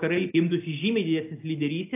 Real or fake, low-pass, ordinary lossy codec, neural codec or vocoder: real; 3.6 kHz; AAC, 32 kbps; none